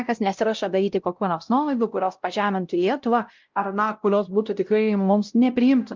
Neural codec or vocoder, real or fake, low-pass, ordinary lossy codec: codec, 16 kHz, 0.5 kbps, X-Codec, WavLM features, trained on Multilingual LibriSpeech; fake; 7.2 kHz; Opus, 24 kbps